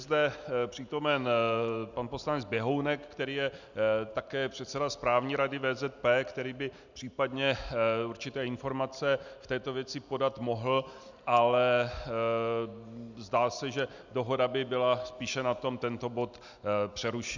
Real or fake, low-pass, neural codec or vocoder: real; 7.2 kHz; none